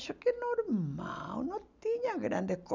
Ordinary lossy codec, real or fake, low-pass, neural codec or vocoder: none; real; 7.2 kHz; none